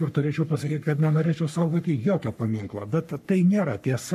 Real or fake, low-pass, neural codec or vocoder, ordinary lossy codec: fake; 14.4 kHz; codec, 44.1 kHz, 3.4 kbps, Pupu-Codec; MP3, 96 kbps